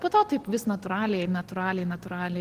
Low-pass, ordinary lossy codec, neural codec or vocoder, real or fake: 14.4 kHz; Opus, 16 kbps; none; real